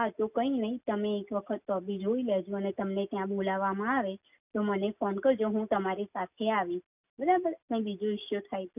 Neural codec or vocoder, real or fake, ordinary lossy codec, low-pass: none; real; none; 3.6 kHz